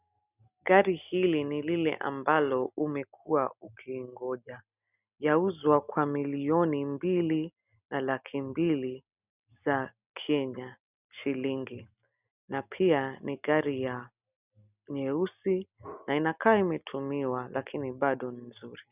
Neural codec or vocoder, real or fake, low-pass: none; real; 3.6 kHz